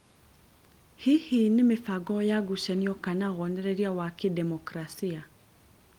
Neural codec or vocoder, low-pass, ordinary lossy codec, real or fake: none; 19.8 kHz; Opus, 24 kbps; real